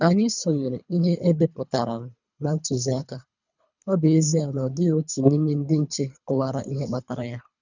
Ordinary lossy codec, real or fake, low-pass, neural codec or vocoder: none; fake; 7.2 kHz; codec, 24 kHz, 3 kbps, HILCodec